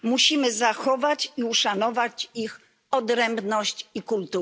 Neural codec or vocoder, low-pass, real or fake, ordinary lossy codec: none; none; real; none